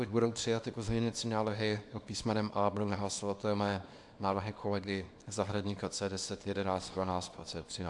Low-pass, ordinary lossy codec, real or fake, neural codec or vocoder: 10.8 kHz; AAC, 64 kbps; fake; codec, 24 kHz, 0.9 kbps, WavTokenizer, small release